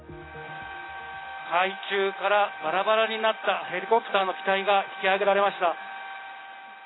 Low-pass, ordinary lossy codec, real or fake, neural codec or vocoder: 7.2 kHz; AAC, 16 kbps; real; none